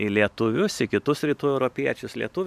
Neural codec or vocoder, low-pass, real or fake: vocoder, 44.1 kHz, 128 mel bands every 512 samples, BigVGAN v2; 14.4 kHz; fake